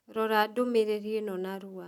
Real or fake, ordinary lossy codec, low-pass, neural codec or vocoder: real; none; 19.8 kHz; none